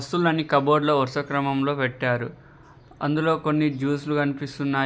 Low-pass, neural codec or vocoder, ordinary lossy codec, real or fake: none; none; none; real